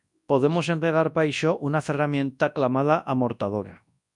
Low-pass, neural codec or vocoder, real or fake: 10.8 kHz; codec, 24 kHz, 0.9 kbps, WavTokenizer, large speech release; fake